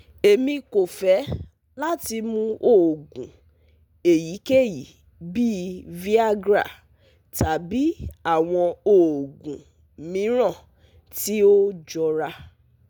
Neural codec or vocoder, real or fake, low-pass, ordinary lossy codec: none; real; none; none